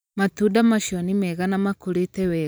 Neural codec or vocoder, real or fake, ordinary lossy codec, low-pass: vocoder, 44.1 kHz, 128 mel bands every 512 samples, BigVGAN v2; fake; none; none